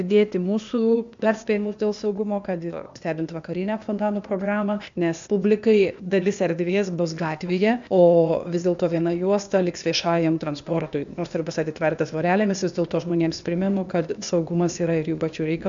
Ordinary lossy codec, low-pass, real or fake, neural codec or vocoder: MP3, 64 kbps; 7.2 kHz; fake; codec, 16 kHz, 0.8 kbps, ZipCodec